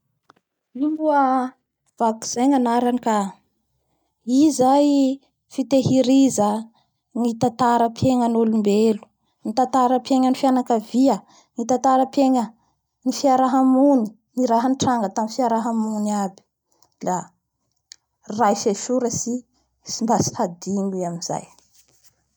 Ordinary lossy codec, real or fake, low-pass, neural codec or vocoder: none; real; 19.8 kHz; none